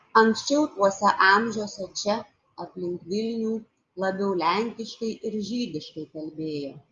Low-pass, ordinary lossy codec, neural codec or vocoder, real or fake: 7.2 kHz; Opus, 32 kbps; none; real